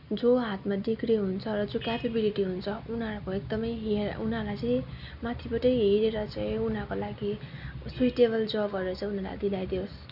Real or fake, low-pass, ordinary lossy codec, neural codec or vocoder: real; 5.4 kHz; none; none